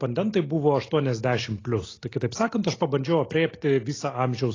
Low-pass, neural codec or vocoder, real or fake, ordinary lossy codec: 7.2 kHz; none; real; AAC, 32 kbps